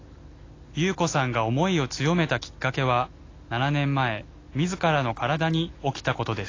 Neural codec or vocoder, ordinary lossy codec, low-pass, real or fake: none; AAC, 32 kbps; 7.2 kHz; real